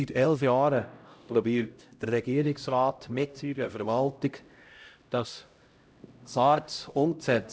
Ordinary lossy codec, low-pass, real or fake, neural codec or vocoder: none; none; fake; codec, 16 kHz, 0.5 kbps, X-Codec, HuBERT features, trained on LibriSpeech